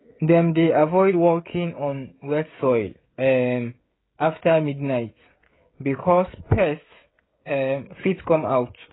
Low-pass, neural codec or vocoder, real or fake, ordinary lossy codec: 7.2 kHz; codec, 16 kHz, 16 kbps, FreqCodec, smaller model; fake; AAC, 16 kbps